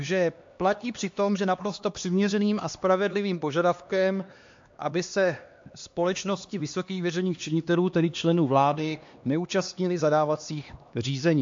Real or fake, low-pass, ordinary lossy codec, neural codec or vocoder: fake; 7.2 kHz; MP3, 48 kbps; codec, 16 kHz, 2 kbps, X-Codec, HuBERT features, trained on LibriSpeech